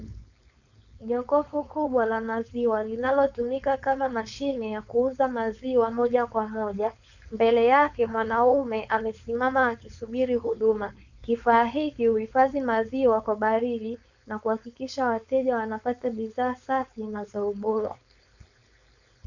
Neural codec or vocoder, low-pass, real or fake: codec, 16 kHz, 4.8 kbps, FACodec; 7.2 kHz; fake